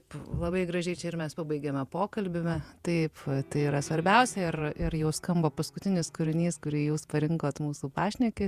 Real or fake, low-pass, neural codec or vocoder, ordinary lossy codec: fake; 14.4 kHz; vocoder, 48 kHz, 128 mel bands, Vocos; Opus, 64 kbps